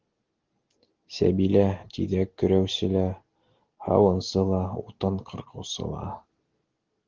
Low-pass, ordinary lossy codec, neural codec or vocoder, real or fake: 7.2 kHz; Opus, 16 kbps; none; real